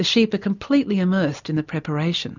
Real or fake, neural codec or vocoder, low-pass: real; none; 7.2 kHz